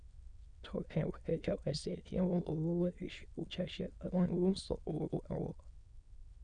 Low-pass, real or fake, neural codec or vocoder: 9.9 kHz; fake; autoencoder, 22.05 kHz, a latent of 192 numbers a frame, VITS, trained on many speakers